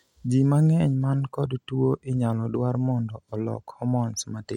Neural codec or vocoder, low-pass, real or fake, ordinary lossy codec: none; 19.8 kHz; real; MP3, 64 kbps